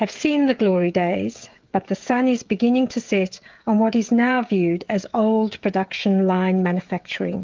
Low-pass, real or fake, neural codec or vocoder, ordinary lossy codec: 7.2 kHz; fake; codec, 16 kHz, 16 kbps, FreqCodec, smaller model; Opus, 32 kbps